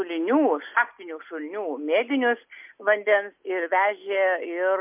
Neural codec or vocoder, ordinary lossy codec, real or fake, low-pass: none; MP3, 32 kbps; real; 3.6 kHz